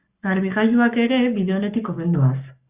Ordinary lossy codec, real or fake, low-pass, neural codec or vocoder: Opus, 64 kbps; fake; 3.6 kHz; codec, 44.1 kHz, 7.8 kbps, Pupu-Codec